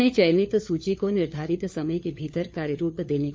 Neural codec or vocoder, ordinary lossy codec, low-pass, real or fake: codec, 16 kHz, 4 kbps, FunCodec, trained on LibriTTS, 50 frames a second; none; none; fake